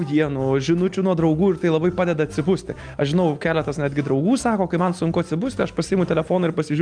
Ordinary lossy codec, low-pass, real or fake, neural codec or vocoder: Opus, 32 kbps; 9.9 kHz; real; none